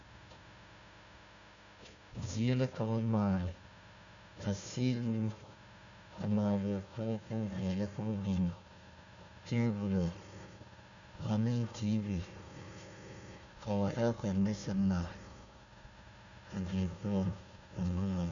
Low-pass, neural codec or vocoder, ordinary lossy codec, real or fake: 7.2 kHz; codec, 16 kHz, 1 kbps, FunCodec, trained on Chinese and English, 50 frames a second; MP3, 96 kbps; fake